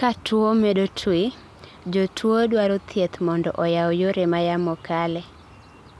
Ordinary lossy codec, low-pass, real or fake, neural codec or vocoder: none; none; real; none